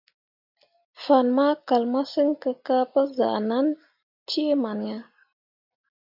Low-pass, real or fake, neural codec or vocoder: 5.4 kHz; real; none